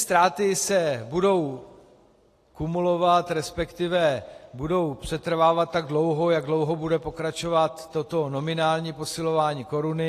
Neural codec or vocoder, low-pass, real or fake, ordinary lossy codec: none; 14.4 kHz; real; AAC, 48 kbps